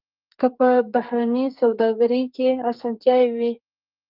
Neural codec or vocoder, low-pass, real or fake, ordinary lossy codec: codec, 44.1 kHz, 2.6 kbps, SNAC; 5.4 kHz; fake; Opus, 24 kbps